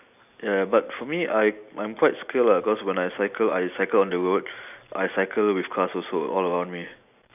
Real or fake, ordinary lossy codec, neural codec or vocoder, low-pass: real; none; none; 3.6 kHz